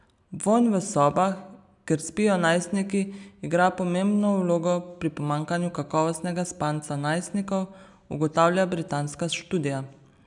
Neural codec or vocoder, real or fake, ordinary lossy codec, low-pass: none; real; none; 10.8 kHz